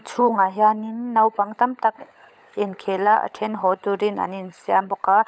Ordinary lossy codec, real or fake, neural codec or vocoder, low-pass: none; fake; codec, 16 kHz, 16 kbps, FunCodec, trained on LibriTTS, 50 frames a second; none